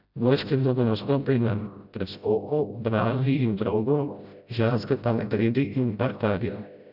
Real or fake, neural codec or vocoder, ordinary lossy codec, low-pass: fake; codec, 16 kHz, 0.5 kbps, FreqCodec, smaller model; none; 5.4 kHz